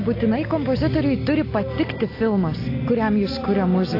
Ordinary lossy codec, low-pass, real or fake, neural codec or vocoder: AAC, 32 kbps; 5.4 kHz; real; none